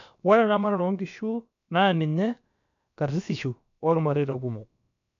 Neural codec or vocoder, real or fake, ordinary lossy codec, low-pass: codec, 16 kHz, about 1 kbps, DyCAST, with the encoder's durations; fake; none; 7.2 kHz